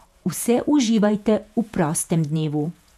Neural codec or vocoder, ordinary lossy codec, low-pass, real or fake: vocoder, 48 kHz, 128 mel bands, Vocos; none; 14.4 kHz; fake